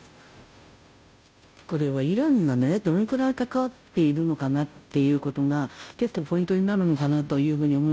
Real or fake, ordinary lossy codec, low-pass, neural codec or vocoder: fake; none; none; codec, 16 kHz, 0.5 kbps, FunCodec, trained on Chinese and English, 25 frames a second